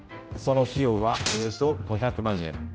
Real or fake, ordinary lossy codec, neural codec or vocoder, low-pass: fake; none; codec, 16 kHz, 0.5 kbps, X-Codec, HuBERT features, trained on general audio; none